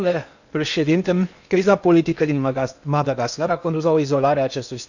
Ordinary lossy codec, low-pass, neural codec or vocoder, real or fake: none; 7.2 kHz; codec, 16 kHz in and 24 kHz out, 0.8 kbps, FocalCodec, streaming, 65536 codes; fake